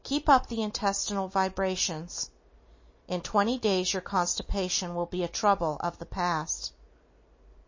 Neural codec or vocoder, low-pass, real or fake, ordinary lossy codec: none; 7.2 kHz; real; MP3, 32 kbps